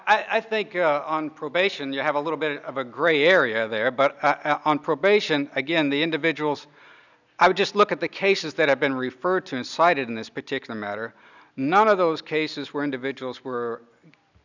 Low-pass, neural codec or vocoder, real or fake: 7.2 kHz; none; real